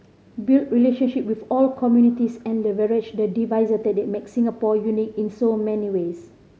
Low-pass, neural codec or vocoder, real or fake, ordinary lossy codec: none; none; real; none